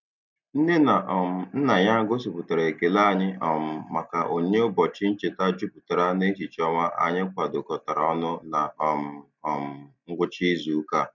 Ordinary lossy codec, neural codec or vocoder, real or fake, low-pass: none; none; real; 7.2 kHz